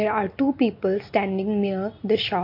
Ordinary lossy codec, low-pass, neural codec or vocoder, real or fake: MP3, 32 kbps; 5.4 kHz; none; real